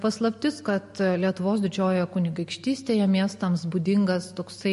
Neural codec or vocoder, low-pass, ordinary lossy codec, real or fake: none; 14.4 kHz; MP3, 48 kbps; real